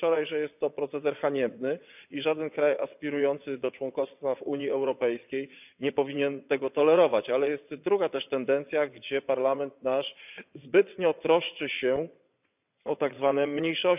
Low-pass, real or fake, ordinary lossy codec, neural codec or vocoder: 3.6 kHz; fake; none; vocoder, 22.05 kHz, 80 mel bands, WaveNeXt